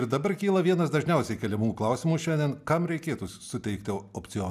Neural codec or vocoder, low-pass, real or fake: none; 14.4 kHz; real